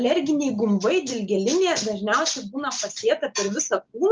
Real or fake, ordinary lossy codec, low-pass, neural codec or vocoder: real; Opus, 24 kbps; 7.2 kHz; none